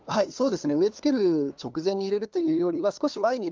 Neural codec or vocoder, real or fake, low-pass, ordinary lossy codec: codec, 16 kHz, 2 kbps, FunCodec, trained on LibriTTS, 25 frames a second; fake; 7.2 kHz; Opus, 32 kbps